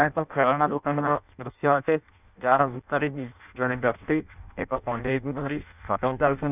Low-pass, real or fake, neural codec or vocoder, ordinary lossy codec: 3.6 kHz; fake; codec, 16 kHz in and 24 kHz out, 0.6 kbps, FireRedTTS-2 codec; none